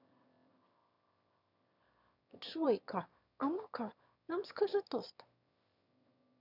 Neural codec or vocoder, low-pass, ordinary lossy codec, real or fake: autoencoder, 22.05 kHz, a latent of 192 numbers a frame, VITS, trained on one speaker; 5.4 kHz; none; fake